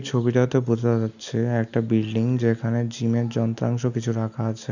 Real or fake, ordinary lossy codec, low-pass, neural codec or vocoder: real; none; 7.2 kHz; none